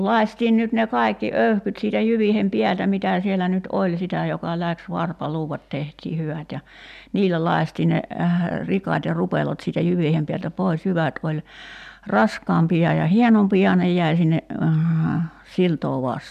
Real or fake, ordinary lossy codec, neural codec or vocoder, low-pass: real; none; none; 14.4 kHz